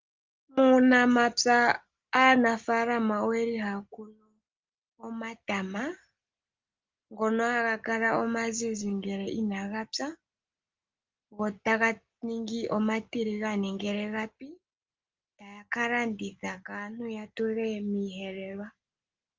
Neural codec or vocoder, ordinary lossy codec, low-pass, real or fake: none; Opus, 32 kbps; 7.2 kHz; real